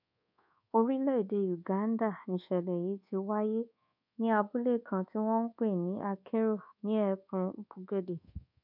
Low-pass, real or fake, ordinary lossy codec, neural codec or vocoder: 5.4 kHz; fake; none; codec, 24 kHz, 1.2 kbps, DualCodec